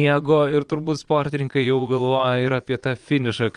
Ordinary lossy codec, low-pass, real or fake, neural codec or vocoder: Opus, 32 kbps; 9.9 kHz; fake; vocoder, 22.05 kHz, 80 mel bands, Vocos